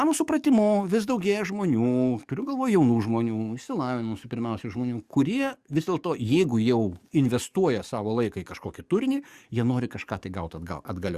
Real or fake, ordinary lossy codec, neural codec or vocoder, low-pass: fake; Opus, 64 kbps; codec, 44.1 kHz, 7.8 kbps, DAC; 14.4 kHz